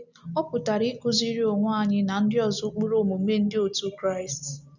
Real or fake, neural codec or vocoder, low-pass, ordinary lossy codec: real; none; 7.2 kHz; none